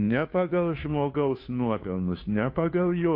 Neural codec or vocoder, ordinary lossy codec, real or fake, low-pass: codec, 16 kHz, 2 kbps, FunCodec, trained on Chinese and English, 25 frames a second; AAC, 32 kbps; fake; 5.4 kHz